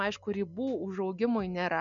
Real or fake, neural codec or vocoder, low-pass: real; none; 7.2 kHz